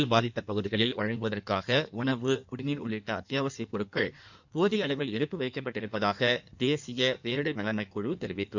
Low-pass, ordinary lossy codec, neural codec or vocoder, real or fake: 7.2 kHz; none; codec, 16 kHz in and 24 kHz out, 1.1 kbps, FireRedTTS-2 codec; fake